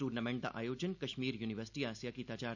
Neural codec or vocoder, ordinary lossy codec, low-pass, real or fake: none; none; 7.2 kHz; real